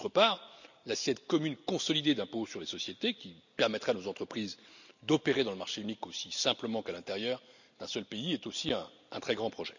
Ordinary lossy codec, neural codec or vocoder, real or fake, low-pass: none; none; real; 7.2 kHz